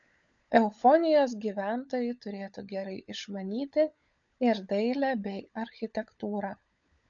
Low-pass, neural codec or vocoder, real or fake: 7.2 kHz; codec, 16 kHz, 16 kbps, FunCodec, trained on LibriTTS, 50 frames a second; fake